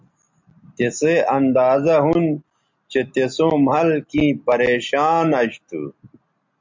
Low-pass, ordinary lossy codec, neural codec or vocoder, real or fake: 7.2 kHz; MP3, 64 kbps; none; real